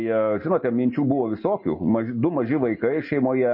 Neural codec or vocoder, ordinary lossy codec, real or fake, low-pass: none; MP3, 24 kbps; real; 5.4 kHz